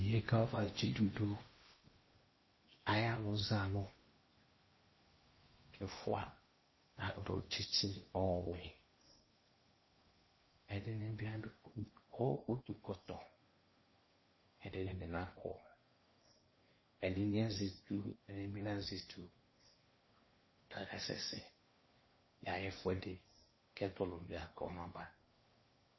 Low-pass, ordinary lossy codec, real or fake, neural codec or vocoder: 7.2 kHz; MP3, 24 kbps; fake; codec, 16 kHz in and 24 kHz out, 0.8 kbps, FocalCodec, streaming, 65536 codes